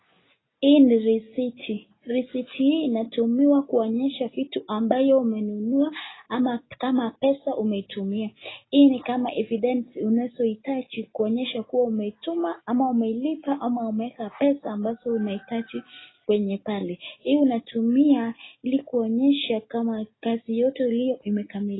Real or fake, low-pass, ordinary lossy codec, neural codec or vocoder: real; 7.2 kHz; AAC, 16 kbps; none